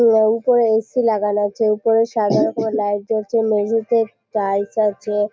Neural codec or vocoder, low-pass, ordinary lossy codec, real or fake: none; none; none; real